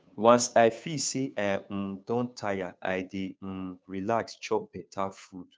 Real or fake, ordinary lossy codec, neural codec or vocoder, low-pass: fake; none; codec, 16 kHz, 2 kbps, FunCodec, trained on Chinese and English, 25 frames a second; none